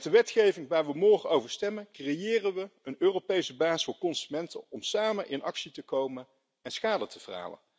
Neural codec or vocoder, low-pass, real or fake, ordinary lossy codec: none; none; real; none